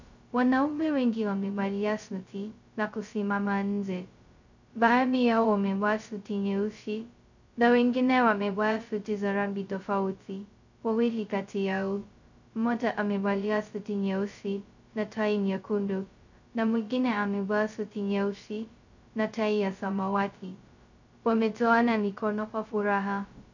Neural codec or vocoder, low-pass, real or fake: codec, 16 kHz, 0.2 kbps, FocalCodec; 7.2 kHz; fake